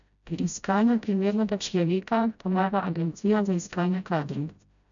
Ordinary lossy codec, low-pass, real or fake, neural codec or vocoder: none; 7.2 kHz; fake; codec, 16 kHz, 0.5 kbps, FreqCodec, smaller model